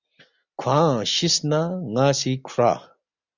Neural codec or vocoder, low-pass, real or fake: none; 7.2 kHz; real